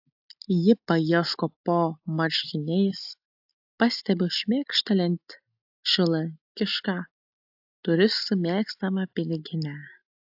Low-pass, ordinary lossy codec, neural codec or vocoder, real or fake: 5.4 kHz; AAC, 48 kbps; none; real